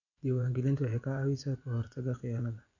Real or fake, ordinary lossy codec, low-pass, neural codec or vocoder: fake; none; 7.2 kHz; vocoder, 24 kHz, 100 mel bands, Vocos